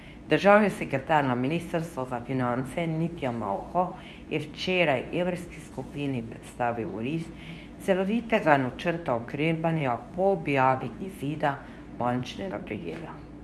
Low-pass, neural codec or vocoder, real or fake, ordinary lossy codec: none; codec, 24 kHz, 0.9 kbps, WavTokenizer, medium speech release version 2; fake; none